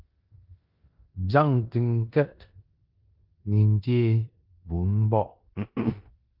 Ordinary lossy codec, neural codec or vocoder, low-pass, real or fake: Opus, 24 kbps; codec, 16 kHz in and 24 kHz out, 0.9 kbps, LongCat-Audio-Codec, four codebook decoder; 5.4 kHz; fake